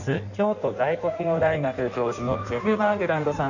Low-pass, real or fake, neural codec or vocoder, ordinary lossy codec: 7.2 kHz; fake; codec, 16 kHz in and 24 kHz out, 1.1 kbps, FireRedTTS-2 codec; none